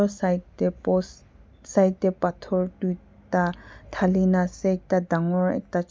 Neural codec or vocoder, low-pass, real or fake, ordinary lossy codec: none; none; real; none